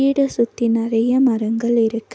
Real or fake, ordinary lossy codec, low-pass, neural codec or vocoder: real; none; none; none